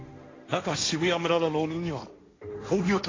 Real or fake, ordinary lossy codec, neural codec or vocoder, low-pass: fake; AAC, 32 kbps; codec, 16 kHz, 1.1 kbps, Voila-Tokenizer; 7.2 kHz